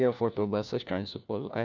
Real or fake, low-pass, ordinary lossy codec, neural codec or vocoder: fake; 7.2 kHz; none; codec, 16 kHz, 1 kbps, FunCodec, trained on LibriTTS, 50 frames a second